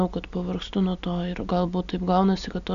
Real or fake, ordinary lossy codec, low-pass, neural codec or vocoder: real; Opus, 64 kbps; 7.2 kHz; none